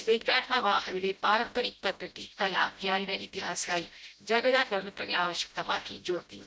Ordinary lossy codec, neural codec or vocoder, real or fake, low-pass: none; codec, 16 kHz, 0.5 kbps, FreqCodec, smaller model; fake; none